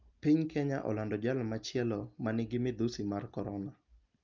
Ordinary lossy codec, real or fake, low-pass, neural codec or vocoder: Opus, 24 kbps; real; 7.2 kHz; none